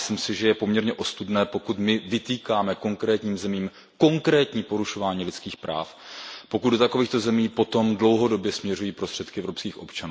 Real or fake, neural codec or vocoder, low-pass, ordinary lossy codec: real; none; none; none